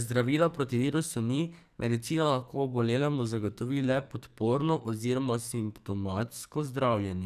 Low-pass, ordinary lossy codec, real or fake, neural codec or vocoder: 14.4 kHz; none; fake; codec, 44.1 kHz, 2.6 kbps, SNAC